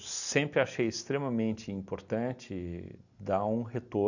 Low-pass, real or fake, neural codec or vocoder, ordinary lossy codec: 7.2 kHz; real; none; none